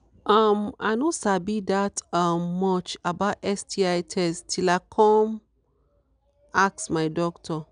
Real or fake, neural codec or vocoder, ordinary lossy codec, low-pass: real; none; none; 9.9 kHz